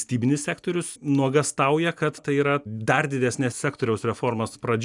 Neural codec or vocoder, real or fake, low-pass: none; real; 10.8 kHz